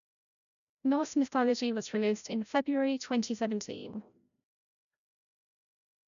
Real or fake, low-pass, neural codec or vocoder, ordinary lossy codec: fake; 7.2 kHz; codec, 16 kHz, 0.5 kbps, FreqCodec, larger model; MP3, 96 kbps